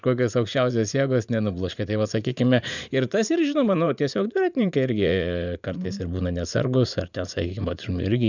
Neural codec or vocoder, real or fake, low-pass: vocoder, 44.1 kHz, 80 mel bands, Vocos; fake; 7.2 kHz